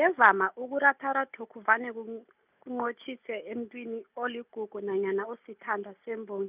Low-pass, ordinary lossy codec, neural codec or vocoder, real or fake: 3.6 kHz; none; none; real